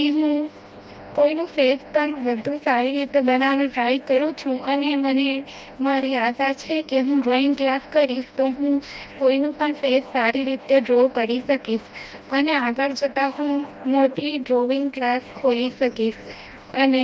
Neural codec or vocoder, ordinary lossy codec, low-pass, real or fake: codec, 16 kHz, 1 kbps, FreqCodec, smaller model; none; none; fake